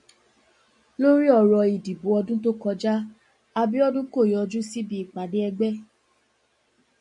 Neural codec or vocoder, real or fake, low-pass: none; real; 10.8 kHz